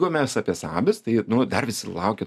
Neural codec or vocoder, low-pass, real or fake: none; 14.4 kHz; real